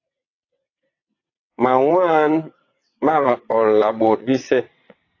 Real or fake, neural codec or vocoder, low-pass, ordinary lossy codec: fake; vocoder, 22.05 kHz, 80 mel bands, WaveNeXt; 7.2 kHz; AAC, 32 kbps